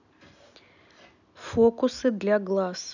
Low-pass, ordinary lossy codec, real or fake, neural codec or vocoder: 7.2 kHz; none; real; none